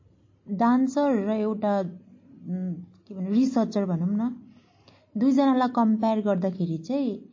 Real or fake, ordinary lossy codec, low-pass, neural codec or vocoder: real; MP3, 32 kbps; 7.2 kHz; none